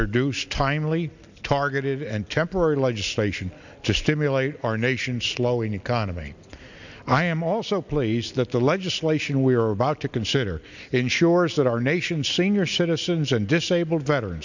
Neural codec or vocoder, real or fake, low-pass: none; real; 7.2 kHz